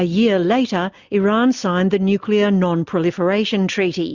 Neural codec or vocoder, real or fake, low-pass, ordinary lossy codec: none; real; 7.2 kHz; Opus, 64 kbps